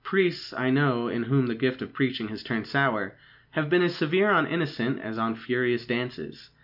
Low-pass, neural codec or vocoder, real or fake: 5.4 kHz; none; real